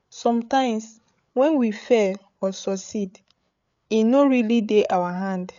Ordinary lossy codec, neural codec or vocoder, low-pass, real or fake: none; codec, 16 kHz, 8 kbps, FreqCodec, larger model; 7.2 kHz; fake